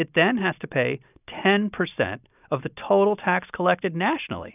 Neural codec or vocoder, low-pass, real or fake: none; 3.6 kHz; real